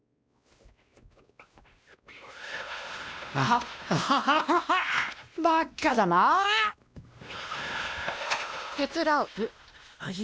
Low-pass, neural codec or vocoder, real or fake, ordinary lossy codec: none; codec, 16 kHz, 1 kbps, X-Codec, WavLM features, trained on Multilingual LibriSpeech; fake; none